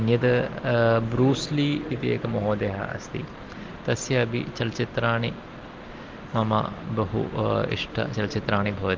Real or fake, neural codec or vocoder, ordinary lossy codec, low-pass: real; none; Opus, 16 kbps; 7.2 kHz